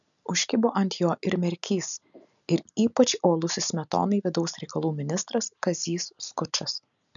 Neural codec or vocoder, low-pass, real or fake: none; 7.2 kHz; real